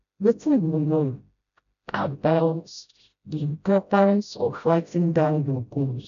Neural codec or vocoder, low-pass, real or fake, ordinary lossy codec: codec, 16 kHz, 0.5 kbps, FreqCodec, smaller model; 7.2 kHz; fake; none